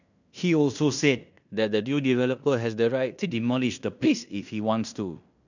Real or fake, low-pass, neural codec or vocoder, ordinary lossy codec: fake; 7.2 kHz; codec, 16 kHz in and 24 kHz out, 0.9 kbps, LongCat-Audio-Codec, fine tuned four codebook decoder; none